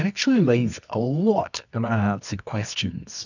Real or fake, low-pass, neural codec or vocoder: fake; 7.2 kHz; codec, 24 kHz, 0.9 kbps, WavTokenizer, medium music audio release